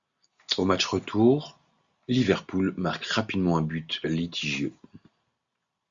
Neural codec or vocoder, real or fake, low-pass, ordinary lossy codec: none; real; 7.2 kHz; Opus, 64 kbps